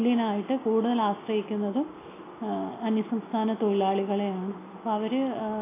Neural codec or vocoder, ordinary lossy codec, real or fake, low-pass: none; AAC, 16 kbps; real; 3.6 kHz